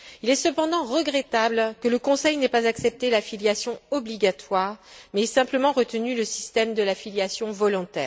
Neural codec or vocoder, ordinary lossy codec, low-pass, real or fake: none; none; none; real